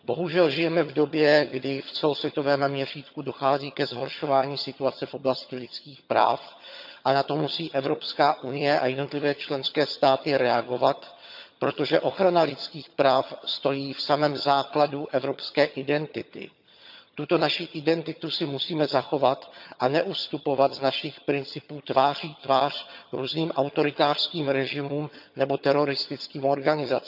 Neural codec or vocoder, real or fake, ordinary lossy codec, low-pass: vocoder, 22.05 kHz, 80 mel bands, HiFi-GAN; fake; none; 5.4 kHz